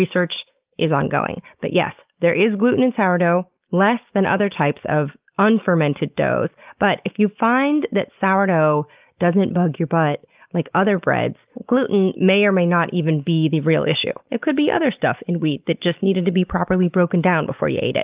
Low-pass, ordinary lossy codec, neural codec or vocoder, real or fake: 3.6 kHz; Opus, 24 kbps; none; real